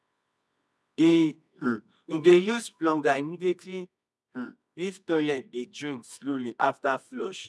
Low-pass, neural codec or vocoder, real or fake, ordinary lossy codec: none; codec, 24 kHz, 0.9 kbps, WavTokenizer, medium music audio release; fake; none